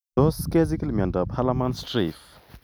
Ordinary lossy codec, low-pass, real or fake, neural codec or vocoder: none; none; real; none